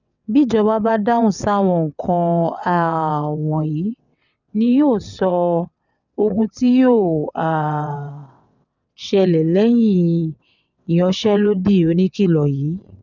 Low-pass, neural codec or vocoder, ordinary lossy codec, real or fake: 7.2 kHz; vocoder, 22.05 kHz, 80 mel bands, WaveNeXt; none; fake